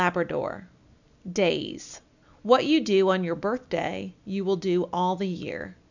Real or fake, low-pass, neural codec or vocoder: real; 7.2 kHz; none